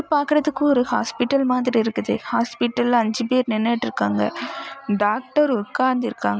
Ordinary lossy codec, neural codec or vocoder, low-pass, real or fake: none; none; none; real